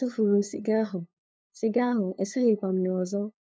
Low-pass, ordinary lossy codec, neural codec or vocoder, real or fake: none; none; codec, 16 kHz, 2 kbps, FunCodec, trained on LibriTTS, 25 frames a second; fake